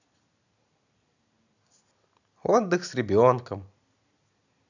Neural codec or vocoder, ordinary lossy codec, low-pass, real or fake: none; none; 7.2 kHz; real